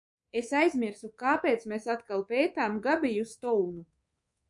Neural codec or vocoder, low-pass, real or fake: codec, 24 kHz, 3.1 kbps, DualCodec; 10.8 kHz; fake